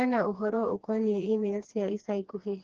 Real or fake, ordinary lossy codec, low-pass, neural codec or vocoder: fake; Opus, 16 kbps; 7.2 kHz; codec, 16 kHz, 2 kbps, FreqCodec, smaller model